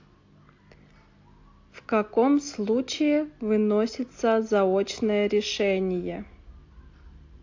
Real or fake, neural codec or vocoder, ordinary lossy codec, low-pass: real; none; AAC, 48 kbps; 7.2 kHz